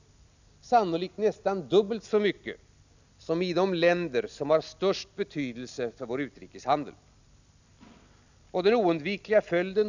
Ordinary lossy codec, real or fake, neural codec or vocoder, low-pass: none; real; none; 7.2 kHz